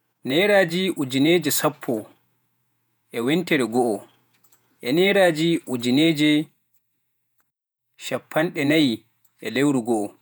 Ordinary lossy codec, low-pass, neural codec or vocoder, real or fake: none; none; vocoder, 48 kHz, 128 mel bands, Vocos; fake